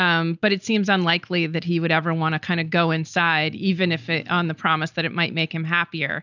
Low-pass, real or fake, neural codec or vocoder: 7.2 kHz; real; none